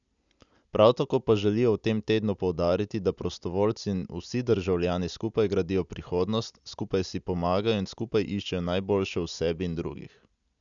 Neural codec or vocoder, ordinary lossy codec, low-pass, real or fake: none; none; 7.2 kHz; real